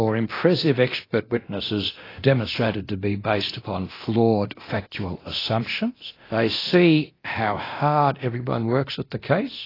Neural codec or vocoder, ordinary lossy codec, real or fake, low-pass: codec, 24 kHz, 0.9 kbps, DualCodec; AAC, 24 kbps; fake; 5.4 kHz